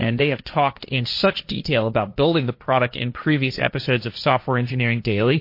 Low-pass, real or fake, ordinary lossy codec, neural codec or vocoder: 5.4 kHz; fake; MP3, 32 kbps; codec, 16 kHz, 1.1 kbps, Voila-Tokenizer